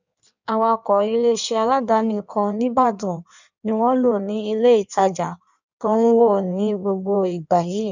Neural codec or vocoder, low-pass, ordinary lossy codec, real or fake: codec, 16 kHz in and 24 kHz out, 1.1 kbps, FireRedTTS-2 codec; 7.2 kHz; none; fake